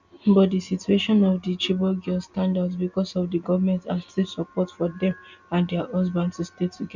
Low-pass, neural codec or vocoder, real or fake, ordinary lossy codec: 7.2 kHz; none; real; none